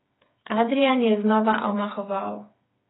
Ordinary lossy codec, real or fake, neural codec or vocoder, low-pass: AAC, 16 kbps; fake; codec, 16 kHz, 4 kbps, FreqCodec, smaller model; 7.2 kHz